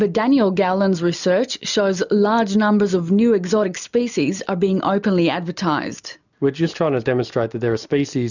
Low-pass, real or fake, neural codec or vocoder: 7.2 kHz; real; none